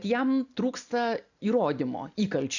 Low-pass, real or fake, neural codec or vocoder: 7.2 kHz; real; none